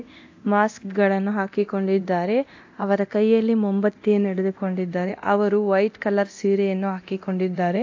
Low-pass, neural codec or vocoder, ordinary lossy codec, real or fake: 7.2 kHz; codec, 24 kHz, 0.9 kbps, DualCodec; AAC, 48 kbps; fake